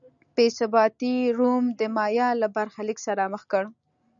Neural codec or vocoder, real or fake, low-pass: none; real; 7.2 kHz